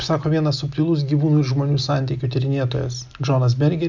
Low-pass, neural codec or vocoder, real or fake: 7.2 kHz; none; real